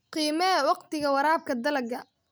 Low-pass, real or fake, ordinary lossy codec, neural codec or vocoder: none; real; none; none